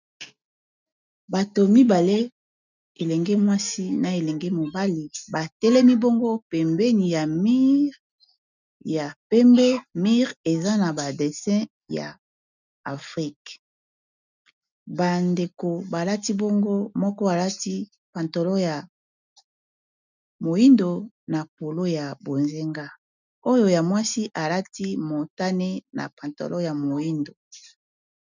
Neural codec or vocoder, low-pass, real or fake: none; 7.2 kHz; real